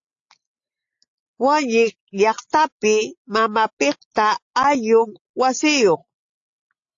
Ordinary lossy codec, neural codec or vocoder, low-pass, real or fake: AAC, 48 kbps; none; 7.2 kHz; real